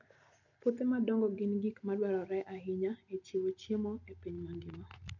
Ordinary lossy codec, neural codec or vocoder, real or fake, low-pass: none; none; real; 7.2 kHz